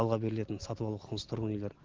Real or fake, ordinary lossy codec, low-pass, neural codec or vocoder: real; Opus, 16 kbps; 7.2 kHz; none